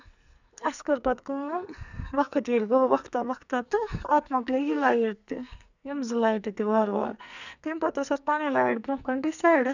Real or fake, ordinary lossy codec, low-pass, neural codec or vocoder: fake; none; 7.2 kHz; codec, 44.1 kHz, 2.6 kbps, SNAC